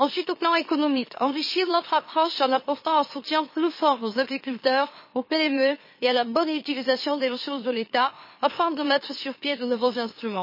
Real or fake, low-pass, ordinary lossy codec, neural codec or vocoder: fake; 5.4 kHz; MP3, 24 kbps; autoencoder, 44.1 kHz, a latent of 192 numbers a frame, MeloTTS